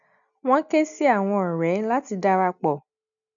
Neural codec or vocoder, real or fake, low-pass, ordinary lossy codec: none; real; 7.2 kHz; none